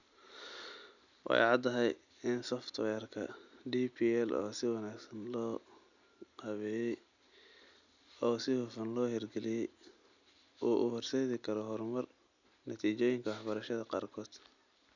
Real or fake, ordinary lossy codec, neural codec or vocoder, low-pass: real; none; none; 7.2 kHz